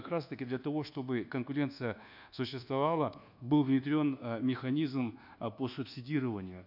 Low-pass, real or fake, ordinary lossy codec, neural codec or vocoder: 5.4 kHz; fake; none; codec, 24 kHz, 1.2 kbps, DualCodec